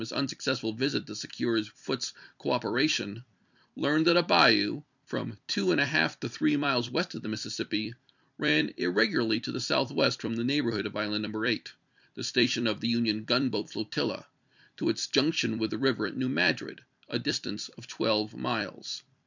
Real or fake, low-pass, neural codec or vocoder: fake; 7.2 kHz; vocoder, 44.1 kHz, 128 mel bands every 256 samples, BigVGAN v2